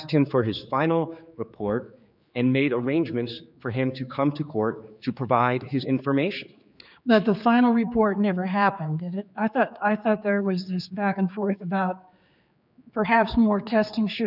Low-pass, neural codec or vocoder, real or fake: 5.4 kHz; codec, 16 kHz, 4 kbps, X-Codec, HuBERT features, trained on general audio; fake